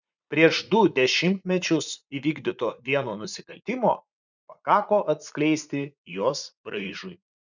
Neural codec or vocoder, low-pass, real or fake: vocoder, 44.1 kHz, 80 mel bands, Vocos; 7.2 kHz; fake